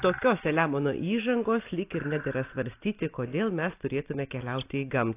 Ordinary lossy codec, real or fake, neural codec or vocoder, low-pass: AAC, 24 kbps; real; none; 3.6 kHz